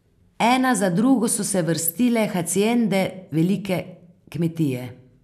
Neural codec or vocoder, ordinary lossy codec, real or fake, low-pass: none; none; real; 14.4 kHz